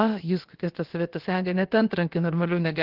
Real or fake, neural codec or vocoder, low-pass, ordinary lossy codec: fake; codec, 16 kHz, about 1 kbps, DyCAST, with the encoder's durations; 5.4 kHz; Opus, 16 kbps